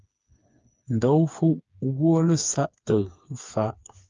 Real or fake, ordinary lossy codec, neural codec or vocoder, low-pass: fake; Opus, 32 kbps; codec, 16 kHz, 4 kbps, FreqCodec, smaller model; 7.2 kHz